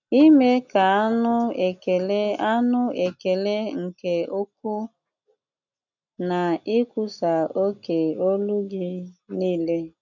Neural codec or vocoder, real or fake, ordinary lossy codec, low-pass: none; real; none; 7.2 kHz